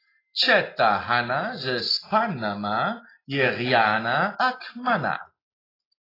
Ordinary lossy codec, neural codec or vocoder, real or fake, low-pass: AAC, 24 kbps; none; real; 5.4 kHz